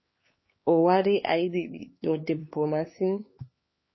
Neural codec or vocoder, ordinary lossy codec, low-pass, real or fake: codec, 16 kHz, 4 kbps, X-Codec, HuBERT features, trained on LibriSpeech; MP3, 24 kbps; 7.2 kHz; fake